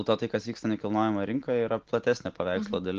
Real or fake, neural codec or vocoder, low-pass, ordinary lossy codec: real; none; 7.2 kHz; Opus, 16 kbps